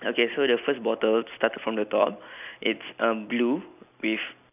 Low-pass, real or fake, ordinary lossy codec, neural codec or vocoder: 3.6 kHz; real; none; none